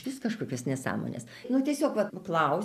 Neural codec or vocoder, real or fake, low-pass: none; real; 14.4 kHz